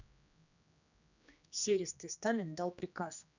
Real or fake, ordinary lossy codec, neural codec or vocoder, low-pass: fake; none; codec, 16 kHz, 2 kbps, X-Codec, HuBERT features, trained on general audio; 7.2 kHz